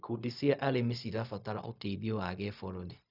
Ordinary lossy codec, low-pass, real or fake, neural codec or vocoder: none; 5.4 kHz; fake; codec, 16 kHz, 0.4 kbps, LongCat-Audio-Codec